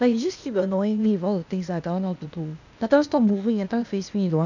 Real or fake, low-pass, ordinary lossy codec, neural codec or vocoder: fake; 7.2 kHz; none; codec, 16 kHz, 0.8 kbps, ZipCodec